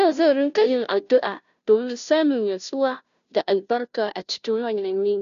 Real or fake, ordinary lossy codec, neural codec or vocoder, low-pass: fake; none; codec, 16 kHz, 0.5 kbps, FunCodec, trained on Chinese and English, 25 frames a second; 7.2 kHz